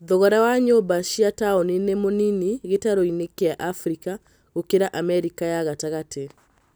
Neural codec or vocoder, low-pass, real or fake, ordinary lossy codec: none; none; real; none